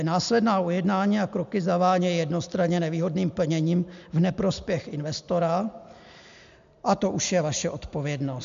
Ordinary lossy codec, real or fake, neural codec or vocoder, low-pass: MP3, 64 kbps; real; none; 7.2 kHz